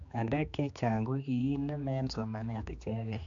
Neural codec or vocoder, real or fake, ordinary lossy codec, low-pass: codec, 16 kHz, 2 kbps, X-Codec, HuBERT features, trained on general audio; fake; AAC, 64 kbps; 7.2 kHz